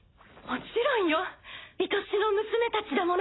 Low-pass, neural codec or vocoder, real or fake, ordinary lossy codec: 7.2 kHz; none; real; AAC, 16 kbps